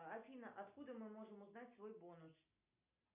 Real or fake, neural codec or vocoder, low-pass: real; none; 3.6 kHz